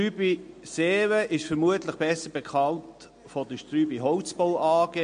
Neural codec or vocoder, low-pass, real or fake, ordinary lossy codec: none; 9.9 kHz; real; MP3, 48 kbps